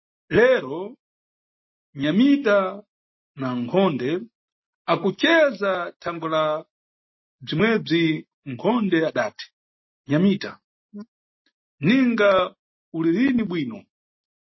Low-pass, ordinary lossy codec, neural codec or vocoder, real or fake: 7.2 kHz; MP3, 24 kbps; none; real